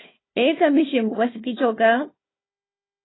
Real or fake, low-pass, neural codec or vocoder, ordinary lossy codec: fake; 7.2 kHz; codec, 16 kHz, 1 kbps, FunCodec, trained on Chinese and English, 50 frames a second; AAC, 16 kbps